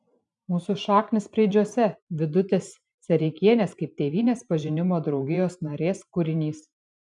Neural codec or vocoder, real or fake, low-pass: vocoder, 44.1 kHz, 128 mel bands every 512 samples, BigVGAN v2; fake; 10.8 kHz